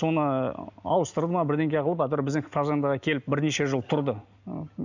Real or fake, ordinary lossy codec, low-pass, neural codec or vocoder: real; none; 7.2 kHz; none